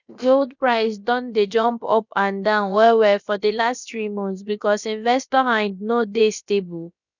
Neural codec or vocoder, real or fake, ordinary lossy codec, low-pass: codec, 16 kHz, about 1 kbps, DyCAST, with the encoder's durations; fake; none; 7.2 kHz